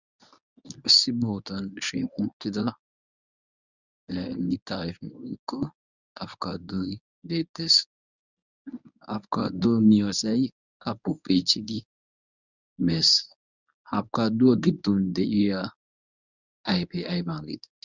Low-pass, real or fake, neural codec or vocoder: 7.2 kHz; fake; codec, 24 kHz, 0.9 kbps, WavTokenizer, medium speech release version 1